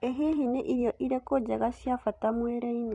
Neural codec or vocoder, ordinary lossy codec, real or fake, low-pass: vocoder, 44.1 kHz, 128 mel bands every 256 samples, BigVGAN v2; none; fake; 10.8 kHz